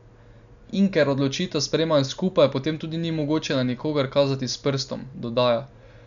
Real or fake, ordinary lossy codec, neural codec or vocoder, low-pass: real; none; none; 7.2 kHz